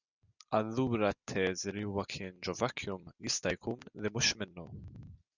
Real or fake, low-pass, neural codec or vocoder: real; 7.2 kHz; none